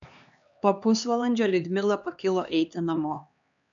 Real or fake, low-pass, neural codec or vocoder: fake; 7.2 kHz; codec, 16 kHz, 2 kbps, X-Codec, HuBERT features, trained on LibriSpeech